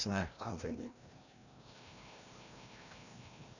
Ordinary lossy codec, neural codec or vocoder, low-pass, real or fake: none; codec, 16 kHz, 1 kbps, FreqCodec, larger model; 7.2 kHz; fake